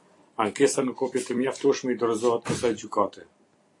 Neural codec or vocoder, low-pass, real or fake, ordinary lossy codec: vocoder, 44.1 kHz, 128 mel bands every 256 samples, BigVGAN v2; 10.8 kHz; fake; AAC, 48 kbps